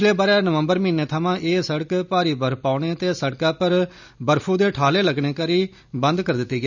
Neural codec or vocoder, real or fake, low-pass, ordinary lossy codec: none; real; 7.2 kHz; none